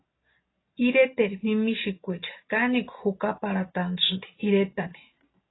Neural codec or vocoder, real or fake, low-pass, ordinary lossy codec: none; real; 7.2 kHz; AAC, 16 kbps